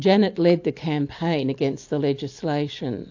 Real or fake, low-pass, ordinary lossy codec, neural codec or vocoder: real; 7.2 kHz; AAC, 48 kbps; none